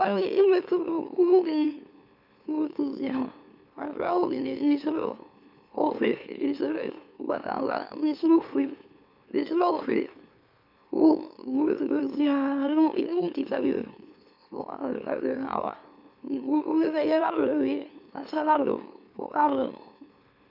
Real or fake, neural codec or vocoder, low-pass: fake; autoencoder, 44.1 kHz, a latent of 192 numbers a frame, MeloTTS; 5.4 kHz